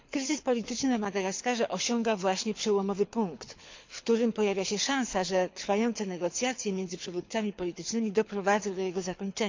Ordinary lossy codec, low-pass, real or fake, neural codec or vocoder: MP3, 64 kbps; 7.2 kHz; fake; codec, 24 kHz, 6 kbps, HILCodec